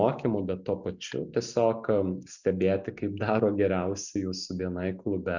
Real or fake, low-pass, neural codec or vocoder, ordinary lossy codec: real; 7.2 kHz; none; Opus, 64 kbps